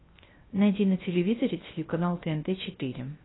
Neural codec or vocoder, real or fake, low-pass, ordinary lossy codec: codec, 16 kHz, 0.3 kbps, FocalCodec; fake; 7.2 kHz; AAC, 16 kbps